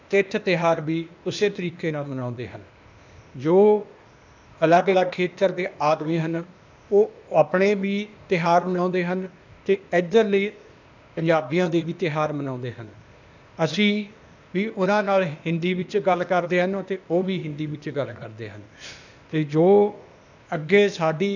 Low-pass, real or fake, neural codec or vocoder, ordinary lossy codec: 7.2 kHz; fake; codec, 16 kHz, 0.8 kbps, ZipCodec; none